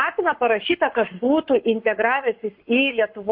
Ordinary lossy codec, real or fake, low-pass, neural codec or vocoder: MP3, 48 kbps; fake; 5.4 kHz; codec, 44.1 kHz, 7.8 kbps, Pupu-Codec